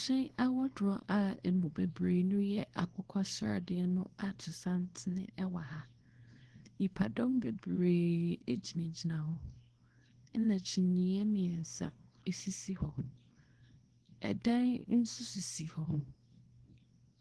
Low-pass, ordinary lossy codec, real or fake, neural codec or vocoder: 10.8 kHz; Opus, 16 kbps; fake; codec, 24 kHz, 0.9 kbps, WavTokenizer, small release